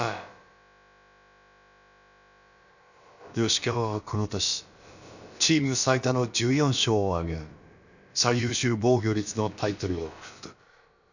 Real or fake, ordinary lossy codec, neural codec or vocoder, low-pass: fake; none; codec, 16 kHz, about 1 kbps, DyCAST, with the encoder's durations; 7.2 kHz